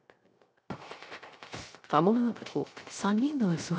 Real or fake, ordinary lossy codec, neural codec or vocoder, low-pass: fake; none; codec, 16 kHz, 0.3 kbps, FocalCodec; none